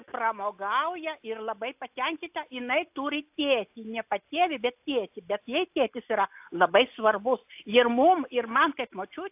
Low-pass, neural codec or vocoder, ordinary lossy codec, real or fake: 3.6 kHz; none; AAC, 32 kbps; real